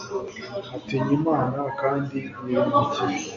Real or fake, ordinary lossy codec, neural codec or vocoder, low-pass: real; AAC, 48 kbps; none; 7.2 kHz